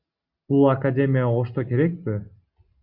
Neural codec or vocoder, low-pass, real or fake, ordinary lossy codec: none; 5.4 kHz; real; Opus, 64 kbps